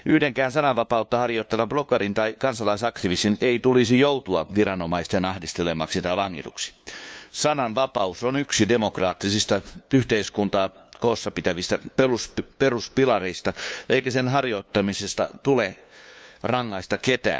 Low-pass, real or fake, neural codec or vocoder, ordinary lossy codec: none; fake; codec, 16 kHz, 2 kbps, FunCodec, trained on LibriTTS, 25 frames a second; none